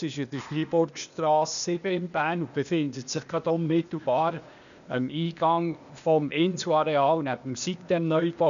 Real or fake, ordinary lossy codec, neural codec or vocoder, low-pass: fake; none; codec, 16 kHz, 0.8 kbps, ZipCodec; 7.2 kHz